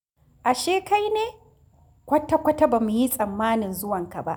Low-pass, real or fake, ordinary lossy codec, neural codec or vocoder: none; real; none; none